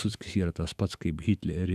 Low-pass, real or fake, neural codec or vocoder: 14.4 kHz; fake; autoencoder, 48 kHz, 128 numbers a frame, DAC-VAE, trained on Japanese speech